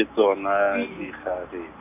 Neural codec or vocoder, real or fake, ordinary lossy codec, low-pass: none; real; none; 3.6 kHz